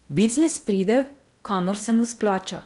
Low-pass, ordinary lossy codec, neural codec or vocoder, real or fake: 10.8 kHz; MP3, 96 kbps; codec, 16 kHz in and 24 kHz out, 0.8 kbps, FocalCodec, streaming, 65536 codes; fake